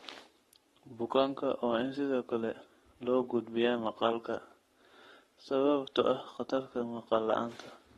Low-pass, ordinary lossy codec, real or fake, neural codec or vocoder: 19.8 kHz; AAC, 32 kbps; fake; codec, 44.1 kHz, 7.8 kbps, Pupu-Codec